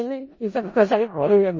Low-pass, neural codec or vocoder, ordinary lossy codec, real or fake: 7.2 kHz; codec, 16 kHz in and 24 kHz out, 0.4 kbps, LongCat-Audio-Codec, four codebook decoder; MP3, 32 kbps; fake